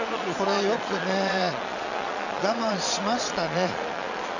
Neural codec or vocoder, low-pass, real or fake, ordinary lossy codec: vocoder, 22.05 kHz, 80 mel bands, Vocos; 7.2 kHz; fake; none